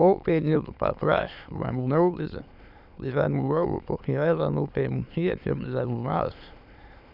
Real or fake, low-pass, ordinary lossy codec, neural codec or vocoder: fake; 5.4 kHz; none; autoencoder, 22.05 kHz, a latent of 192 numbers a frame, VITS, trained on many speakers